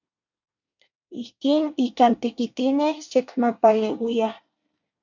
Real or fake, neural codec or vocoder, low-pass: fake; codec, 24 kHz, 1 kbps, SNAC; 7.2 kHz